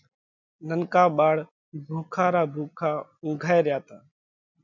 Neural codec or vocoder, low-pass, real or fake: none; 7.2 kHz; real